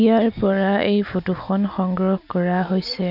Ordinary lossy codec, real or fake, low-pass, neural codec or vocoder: none; real; 5.4 kHz; none